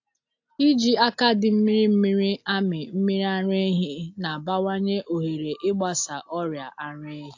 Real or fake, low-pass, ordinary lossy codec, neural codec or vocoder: real; 7.2 kHz; AAC, 48 kbps; none